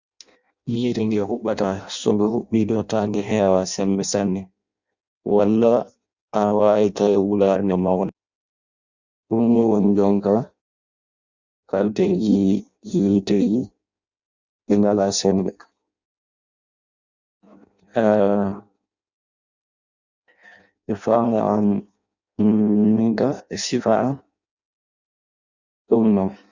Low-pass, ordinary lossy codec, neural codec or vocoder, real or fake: 7.2 kHz; Opus, 64 kbps; codec, 16 kHz in and 24 kHz out, 0.6 kbps, FireRedTTS-2 codec; fake